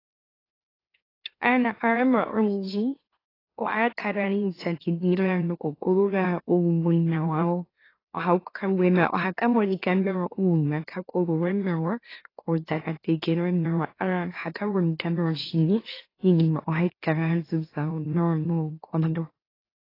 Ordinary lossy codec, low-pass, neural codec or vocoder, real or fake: AAC, 24 kbps; 5.4 kHz; autoencoder, 44.1 kHz, a latent of 192 numbers a frame, MeloTTS; fake